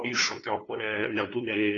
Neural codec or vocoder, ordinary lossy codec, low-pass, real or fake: codec, 16 kHz, 2 kbps, FunCodec, trained on LibriTTS, 25 frames a second; AAC, 32 kbps; 7.2 kHz; fake